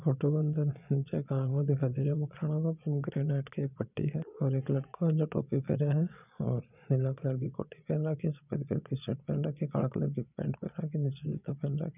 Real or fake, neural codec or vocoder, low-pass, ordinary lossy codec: fake; vocoder, 22.05 kHz, 80 mel bands, Vocos; 3.6 kHz; none